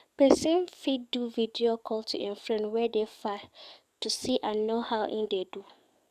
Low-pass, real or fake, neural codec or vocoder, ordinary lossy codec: 14.4 kHz; fake; codec, 44.1 kHz, 7.8 kbps, DAC; none